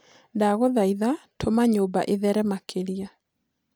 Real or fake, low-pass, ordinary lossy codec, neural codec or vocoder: real; none; none; none